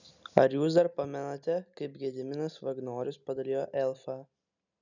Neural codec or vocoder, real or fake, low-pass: none; real; 7.2 kHz